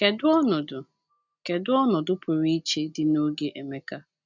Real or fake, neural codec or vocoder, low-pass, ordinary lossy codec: real; none; 7.2 kHz; none